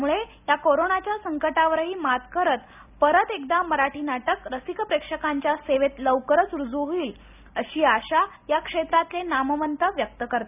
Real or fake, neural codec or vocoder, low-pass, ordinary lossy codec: real; none; 3.6 kHz; none